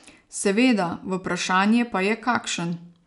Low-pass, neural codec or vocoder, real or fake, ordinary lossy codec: 10.8 kHz; none; real; none